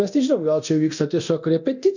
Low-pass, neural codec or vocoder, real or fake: 7.2 kHz; codec, 24 kHz, 0.9 kbps, DualCodec; fake